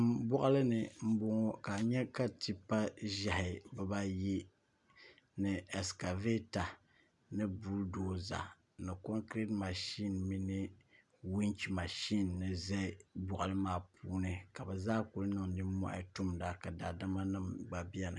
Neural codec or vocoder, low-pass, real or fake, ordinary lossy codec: none; 10.8 kHz; real; MP3, 96 kbps